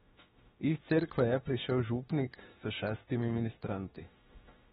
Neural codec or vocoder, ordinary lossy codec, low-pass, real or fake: autoencoder, 48 kHz, 32 numbers a frame, DAC-VAE, trained on Japanese speech; AAC, 16 kbps; 19.8 kHz; fake